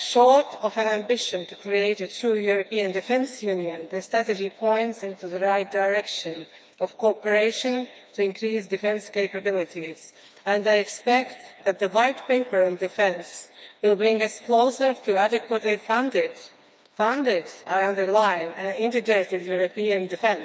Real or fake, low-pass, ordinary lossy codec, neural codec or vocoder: fake; none; none; codec, 16 kHz, 2 kbps, FreqCodec, smaller model